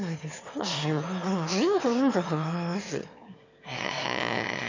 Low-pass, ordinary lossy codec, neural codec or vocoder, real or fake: 7.2 kHz; MP3, 64 kbps; autoencoder, 22.05 kHz, a latent of 192 numbers a frame, VITS, trained on one speaker; fake